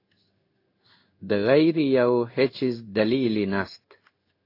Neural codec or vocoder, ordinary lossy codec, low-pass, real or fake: codec, 16 kHz in and 24 kHz out, 1 kbps, XY-Tokenizer; AAC, 32 kbps; 5.4 kHz; fake